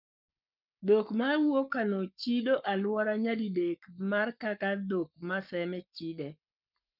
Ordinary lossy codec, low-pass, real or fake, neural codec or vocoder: none; 5.4 kHz; fake; codec, 44.1 kHz, 7.8 kbps, Pupu-Codec